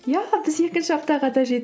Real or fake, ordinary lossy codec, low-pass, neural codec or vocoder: real; none; none; none